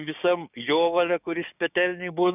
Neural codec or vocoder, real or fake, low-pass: codec, 16 kHz, 6 kbps, DAC; fake; 3.6 kHz